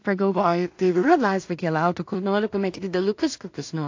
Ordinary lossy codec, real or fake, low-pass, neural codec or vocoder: AAC, 48 kbps; fake; 7.2 kHz; codec, 16 kHz in and 24 kHz out, 0.4 kbps, LongCat-Audio-Codec, two codebook decoder